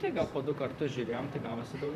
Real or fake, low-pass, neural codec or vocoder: fake; 14.4 kHz; vocoder, 44.1 kHz, 128 mel bands, Pupu-Vocoder